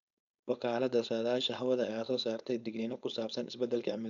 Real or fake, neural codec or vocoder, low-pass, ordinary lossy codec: fake; codec, 16 kHz, 4.8 kbps, FACodec; 7.2 kHz; none